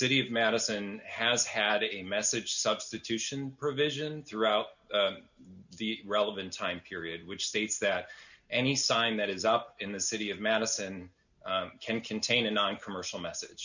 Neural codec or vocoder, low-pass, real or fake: none; 7.2 kHz; real